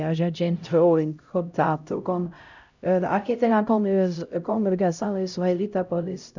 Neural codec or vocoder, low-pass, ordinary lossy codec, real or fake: codec, 16 kHz, 0.5 kbps, X-Codec, HuBERT features, trained on LibriSpeech; 7.2 kHz; none; fake